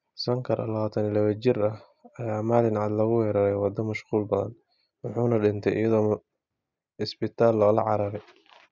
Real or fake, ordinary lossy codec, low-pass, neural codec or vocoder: real; none; 7.2 kHz; none